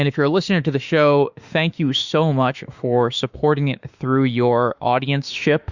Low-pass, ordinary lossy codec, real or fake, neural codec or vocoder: 7.2 kHz; Opus, 64 kbps; fake; autoencoder, 48 kHz, 32 numbers a frame, DAC-VAE, trained on Japanese speech